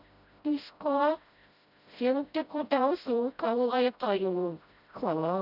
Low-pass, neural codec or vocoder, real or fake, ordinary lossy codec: 5.4 kHz; codec, 16 kHz, 0.5 kbps, FreqCodec, smaller model; fake; none